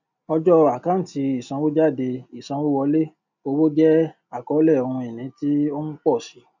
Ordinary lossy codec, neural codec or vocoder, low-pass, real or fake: none; none; 7.2 kHz; real